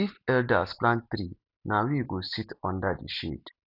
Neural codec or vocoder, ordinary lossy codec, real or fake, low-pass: none; none; real; 5.4 kHz